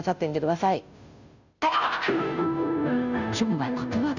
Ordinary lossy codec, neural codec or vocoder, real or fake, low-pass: none; codec, 16 kHz, 0.5 kbps, FunCodec, trained on Chinese and English, 25 frames a second; fake; 7.2 kHz